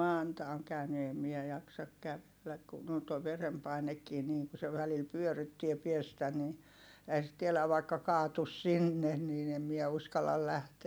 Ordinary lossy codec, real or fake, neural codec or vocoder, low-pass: none; real; none; none